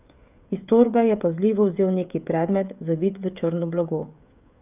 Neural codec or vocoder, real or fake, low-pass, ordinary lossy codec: codec, 16 kHz, 16 kbps, FreqCodec, smaller model; fake; 3.6 kHz; none